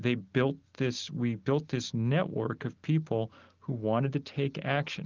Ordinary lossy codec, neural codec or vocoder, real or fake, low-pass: Opus, 32 kbps; none; real; 7.2 kHz